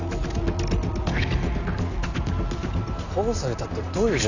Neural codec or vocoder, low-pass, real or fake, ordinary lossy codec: none; 7.2 kHz; real; none